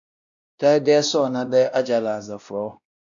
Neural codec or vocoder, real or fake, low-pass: codec, 16 kHz, 1 kbps, X-Codec, WavLM features, trained on Multilingual LibriSpeech; fake; 7.2 kHz